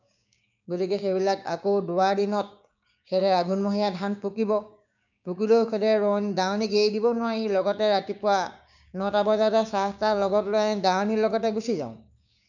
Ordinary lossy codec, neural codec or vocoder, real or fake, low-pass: none; codec, 16 kHz, 6 kbps, DAC; fake; 7.2 kHz